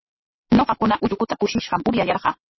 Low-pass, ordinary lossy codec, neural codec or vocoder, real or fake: 7.2 kHz; MP3, 24 kbps; none; real